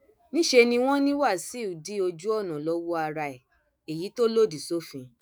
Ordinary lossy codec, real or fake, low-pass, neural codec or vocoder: none; fake; none; autoencoder, 48 kHz, 128 numbers a frame, DAC-VAE, trained on Japanese speech